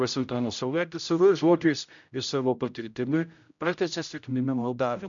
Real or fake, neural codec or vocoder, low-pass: fake; codec, 16 kHz, 0.5 kbps, X-Codec, HuBERT features, trained on general audio; 7.2 kHz